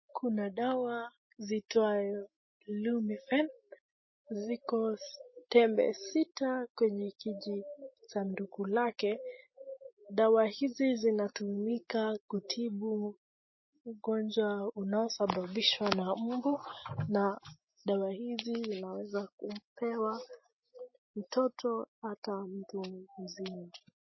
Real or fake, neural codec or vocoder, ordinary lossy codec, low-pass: real; none; MP3, 24 kbps; 7.2 kHz